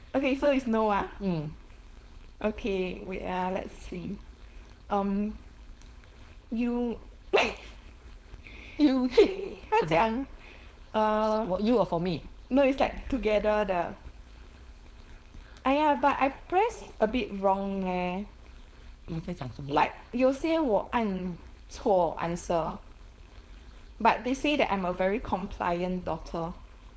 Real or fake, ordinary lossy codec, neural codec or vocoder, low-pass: fake; none; codec, 16 kHz, 4.8 kbps, FACodec; none